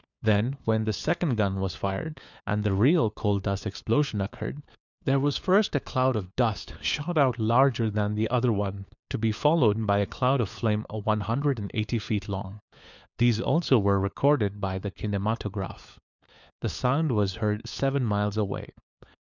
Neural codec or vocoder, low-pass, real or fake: codec, 16 kHz, 4 kbps, FunCodec, trained on LibriTTS, 50 frames a second; 7.2 kHz; fake